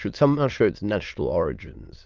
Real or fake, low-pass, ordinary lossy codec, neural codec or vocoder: fake; 7.2 kHz; Opus, 24 kbps; autoencoder, 22.05 kHz, a latent of 192 numbers a frame, VITS, trained on many speakers